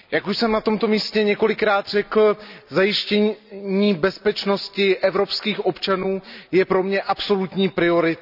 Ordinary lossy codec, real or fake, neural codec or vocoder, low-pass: none; real; none; 5.4 kHz